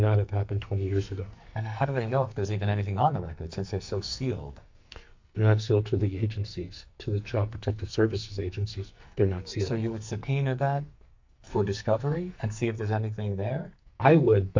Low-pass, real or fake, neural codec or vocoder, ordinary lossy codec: 7.2 kHz; fake; codec, 44.1 kHz, 2.6 kbps, SNAC; MP3, 64 kbps